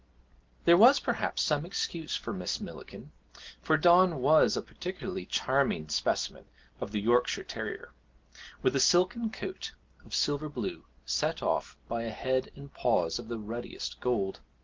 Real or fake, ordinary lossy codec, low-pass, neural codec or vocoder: real; Opus, 16 kbps; 7.2 kHz; none